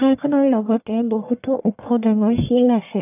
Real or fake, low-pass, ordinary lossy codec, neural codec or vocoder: fake; 3.6 kHz; none; codec, 44.1 kHz, 1.7 kbps, Pupu-Codec